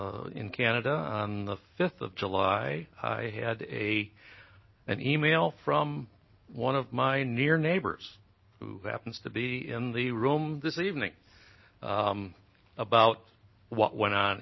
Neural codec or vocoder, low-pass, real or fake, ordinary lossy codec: none; 7.2 kHz; real; MP3, 24 kbps